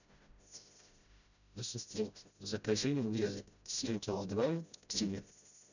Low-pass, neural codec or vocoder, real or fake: 7.2 kHz; codec, 16 kHz, 0.5 kbps, FreqCodec, smaller model; fake